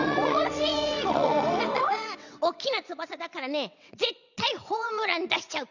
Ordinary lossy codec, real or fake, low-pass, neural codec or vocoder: none; fake; 7.2 kHz; vocoder, 22.05 kHz, 80 mel bands, WaveNeXt